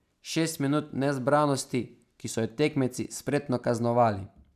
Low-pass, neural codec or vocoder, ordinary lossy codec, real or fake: 14.4 kHz; none; none; real